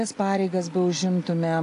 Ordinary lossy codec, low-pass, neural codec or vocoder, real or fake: AAC, 48 kbps; 10.8 kHz; none; real